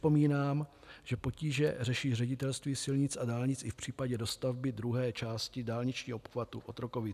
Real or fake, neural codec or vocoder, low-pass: real; none; 14.4 kHz